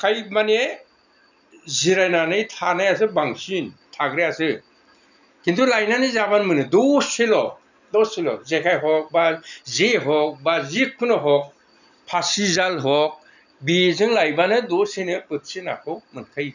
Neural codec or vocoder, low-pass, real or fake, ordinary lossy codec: none; 7.2 kHz; real; none